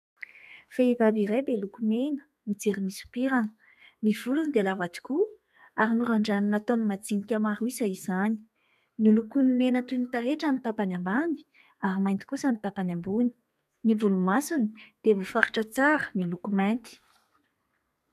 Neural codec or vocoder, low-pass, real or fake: codec, 32 kHz, 1.9 kbps, SNAC; 14.4 kHz; fake